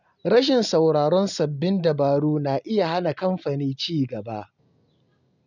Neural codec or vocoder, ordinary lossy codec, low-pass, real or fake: none; none; 7.2 kHz; real